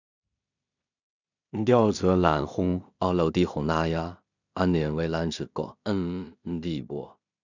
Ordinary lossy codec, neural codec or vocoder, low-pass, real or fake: none; codec, 16 kHz in and 24 kHz out, 0.4 kbps, LongCat-Audio-Codec, two codebook decoder; 7.2 kHz; fake